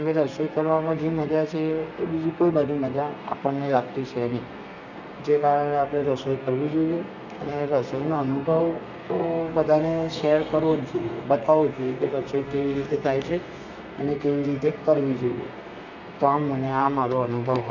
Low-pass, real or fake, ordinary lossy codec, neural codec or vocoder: 7.2 kHz; fake; none; codec, 32 kHz, 1.9 kbps, SNAC